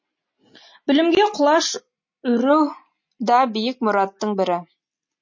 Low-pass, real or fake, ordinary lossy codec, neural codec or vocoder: 7.2 kHz; real; MP3, 32 kbps; none